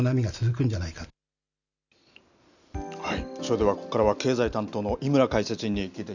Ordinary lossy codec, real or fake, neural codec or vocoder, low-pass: none; real; none; 7.2 kHz